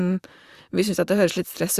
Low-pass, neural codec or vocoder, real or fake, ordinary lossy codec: 14.4 kHz; vocoder, 44.1 kHz, 128 mel bands, Pupu-Vocoder; fake; Opus, 64 kbps